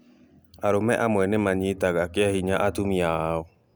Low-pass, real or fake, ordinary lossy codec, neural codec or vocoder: none; real; none; none